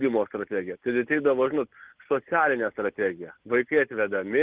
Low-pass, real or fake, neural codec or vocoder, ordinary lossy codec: 3.6 kHz; real; none; Opus, 16 kbps